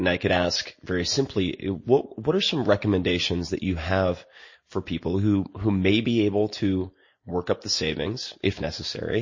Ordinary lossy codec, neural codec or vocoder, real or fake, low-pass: MP3, 32 kbps; none; real; 7.2 kHz